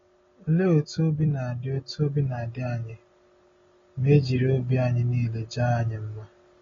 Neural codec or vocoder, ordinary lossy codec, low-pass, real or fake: none; AAC, 24 kbps; 7.2 kHz; real